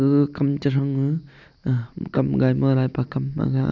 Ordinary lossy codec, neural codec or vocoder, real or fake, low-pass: none; none; real; 7.2 kHz